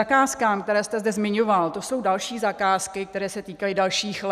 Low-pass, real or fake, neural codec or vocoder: 14.4 kHz; real; none